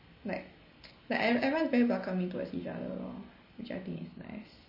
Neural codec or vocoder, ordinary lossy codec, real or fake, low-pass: none; MP3, 24 kbps; real; 5.4 kHz